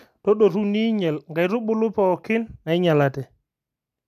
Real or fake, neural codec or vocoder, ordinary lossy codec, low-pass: real; none; AAC, 96 kbps; 14.4 kHz